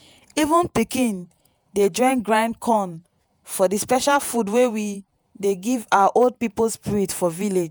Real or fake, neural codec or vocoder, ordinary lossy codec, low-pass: fake; vocoder, 48 kHz, 128 mel bands, Vocos; none; none